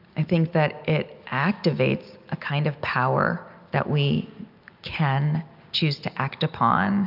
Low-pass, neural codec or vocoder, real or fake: 5.4 kHz; none; real